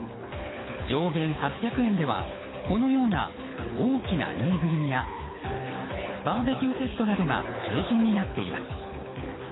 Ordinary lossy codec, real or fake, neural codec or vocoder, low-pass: AAC, 16 kbps; fake; codec, 24 kHz, 6 kbps, HILCodec; 7.2 kHz